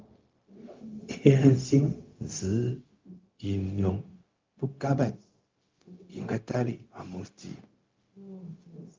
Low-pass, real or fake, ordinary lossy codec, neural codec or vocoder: 7.2 kHz; fake; Opus, 32 kbps; codec, 16 kHz, 0.4 kbps, LongCat-Audio-Codec